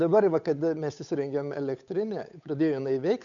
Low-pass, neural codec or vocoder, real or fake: 7.2 kHz; codec, 16 kHz, 8 kbps, FunCodec, trained on Chinese and English, 25 frames a second; fake